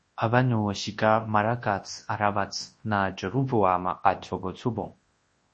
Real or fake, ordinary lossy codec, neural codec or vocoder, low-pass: fake; MP3, 32 kbps; codec, 24 kHz, 0.9 kbps, WavTokenizer, large speech release; 10.8 kHz